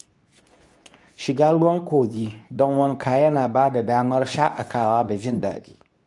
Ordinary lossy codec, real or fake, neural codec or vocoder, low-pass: none; fake; codec, 24 kHz, 0.9 kbps, WavTokenizer, medium speech release version 2; 10.8 kHz